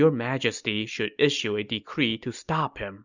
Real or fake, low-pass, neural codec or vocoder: real; 7.2 kHz; none